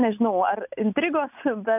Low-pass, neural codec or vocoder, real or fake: 3.6 kHz; none; real